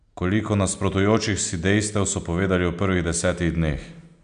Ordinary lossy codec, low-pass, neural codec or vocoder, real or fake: MP3, 96 kbps; 9.9 kHz; none; real